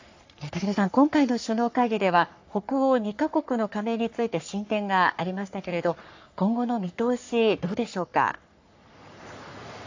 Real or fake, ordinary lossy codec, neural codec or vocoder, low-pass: fake; AAC, 48 kbps; codec, 44.1 kHz, 3.4 kbps, Pupu-Codec; 7.2 kHz